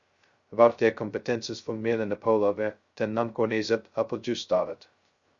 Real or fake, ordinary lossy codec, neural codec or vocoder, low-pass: fake; Opus, 64 kbps; codec, 16 kHz, 0.2 kbps, FocalCodec; 7.2 kHz